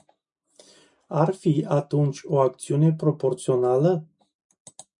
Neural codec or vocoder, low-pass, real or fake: none; 10.8 kHz; real